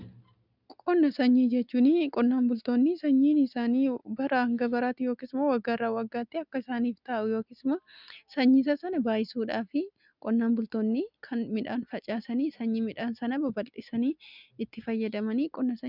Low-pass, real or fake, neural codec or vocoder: 5.4 kHz; real; none